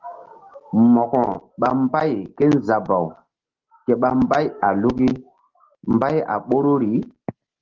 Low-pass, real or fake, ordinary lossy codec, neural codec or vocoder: 7.2 kHz; real; Opus, 16 kbps; none